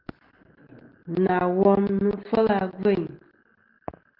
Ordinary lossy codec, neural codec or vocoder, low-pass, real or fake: Opus, 16 kbps; none; 5.4 kHz; real